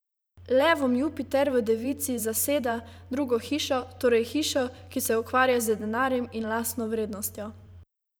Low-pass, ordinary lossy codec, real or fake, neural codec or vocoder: none; none; fake; vocoder, 44.1 kHz, 128 mel bands every 512 samples, BigVGAN v2